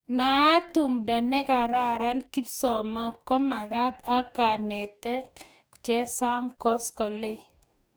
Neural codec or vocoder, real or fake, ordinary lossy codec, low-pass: codec, 44.1 kHz, 2.6 kbps, DAC; fake; none; none